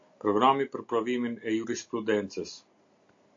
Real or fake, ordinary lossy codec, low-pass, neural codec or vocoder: real; AAC, 48 kbps; 7.2 kHz; none